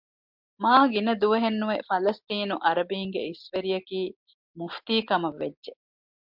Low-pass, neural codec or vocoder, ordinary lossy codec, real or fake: 5.4 kHz; none; MP3, 48 kbps; real